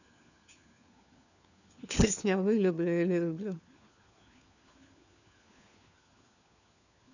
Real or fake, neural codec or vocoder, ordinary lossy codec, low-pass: fake; codec, 16 kHz, 4 kbps, FunCodec, trained on LibriTTS, 50 frames a second; none; 7.2 kHz